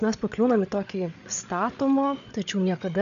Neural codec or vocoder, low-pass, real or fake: codec, 16 kHz, 8 kbps, FreqCodec, larger model; 7.2 kHz; fake